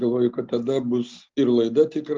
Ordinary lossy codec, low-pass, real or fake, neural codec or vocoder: Opus, 24 kbps; 7.2 kHz; real; none